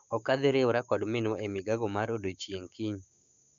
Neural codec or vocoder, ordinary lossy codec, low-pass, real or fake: codec, 16 kHz, 6 kbps, DAC; none; 7.2 kHz; fake